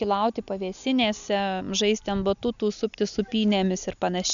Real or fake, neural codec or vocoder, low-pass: real; none; 7.2 kHz